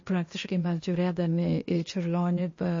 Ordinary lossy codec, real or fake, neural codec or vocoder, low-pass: MP3, 32 kbps; fake; codec, 16 kHz, 0.8 kbps, ZipCodec; 7.2 kHz